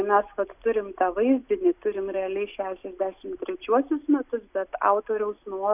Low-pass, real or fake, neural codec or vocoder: 3.6 kHz; real; none